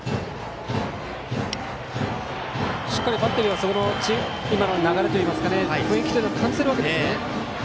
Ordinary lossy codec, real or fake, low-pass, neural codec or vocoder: none; real; none; none